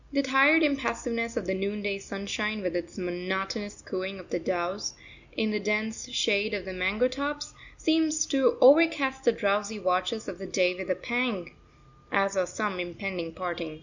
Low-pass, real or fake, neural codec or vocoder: 7.2 kHz; real; none